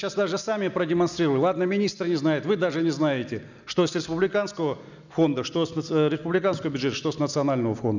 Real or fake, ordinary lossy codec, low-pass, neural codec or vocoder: real; none; 7.2 kHz; none